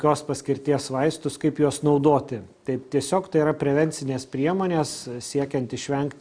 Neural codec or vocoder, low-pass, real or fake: none; 9.9 kHz; real